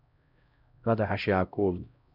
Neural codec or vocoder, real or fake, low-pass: codec, 16 kHz, 0.5 kbps, X-Codec, HuBERT features, trained on LibriSpeech; fake; 5.4 kHz